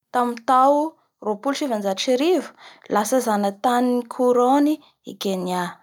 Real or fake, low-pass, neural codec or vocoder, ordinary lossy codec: real; 19.8 kHz; none; none